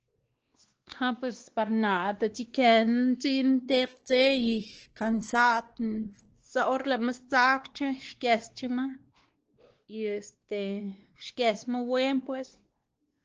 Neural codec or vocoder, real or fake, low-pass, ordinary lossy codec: codec, 16 kHz, 2 kbps, X-Codec, WavLM features, trained on Multilingual LibriSpeech; fake; 7.2 kHz; Opus, 16 kbps